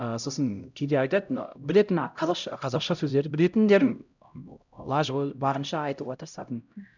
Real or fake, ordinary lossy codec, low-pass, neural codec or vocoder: fake; none; 7.2 kHz; codec, 16 kHz, 0.5 kbps, X-Codec, HuBERT features, trained on LibriSpeech